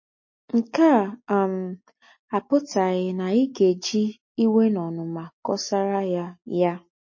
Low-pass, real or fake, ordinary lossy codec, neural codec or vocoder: 7.2 kHz; real; MP3, 32 kbps; none